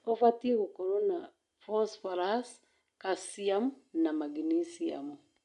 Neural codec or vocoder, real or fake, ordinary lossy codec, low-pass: none; real; MP3, 64 kbps; 10.8 kHz